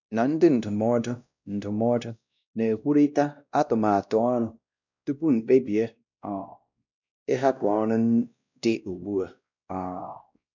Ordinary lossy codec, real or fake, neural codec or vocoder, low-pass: none; fake; codec, 16 kHz, 1 kbps, X-Codec, WavLM features, trained on Multilingual LibriSpeech; 7.2 kHz